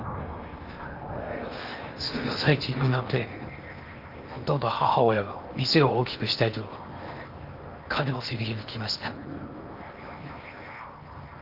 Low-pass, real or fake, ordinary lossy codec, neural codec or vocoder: 5.4 kHz; fake; Opus, 32 kbps; codec, 16 kHz in and 24 kHz out, 0.8 kbps, FocalCodec, streaming, 65536 codes